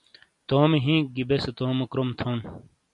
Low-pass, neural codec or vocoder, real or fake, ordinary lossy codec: 10.8 kHz; none; real; MP3, 96 kbps